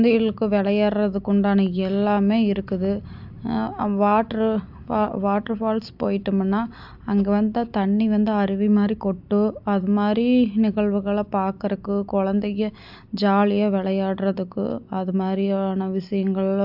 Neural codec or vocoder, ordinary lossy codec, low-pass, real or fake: none; AAC, 48 kbps; 5.4 kHz; real